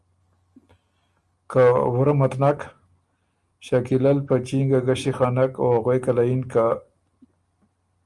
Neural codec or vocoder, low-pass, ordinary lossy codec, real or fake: none; 10.8 kHz; Opus, 32 kbps; real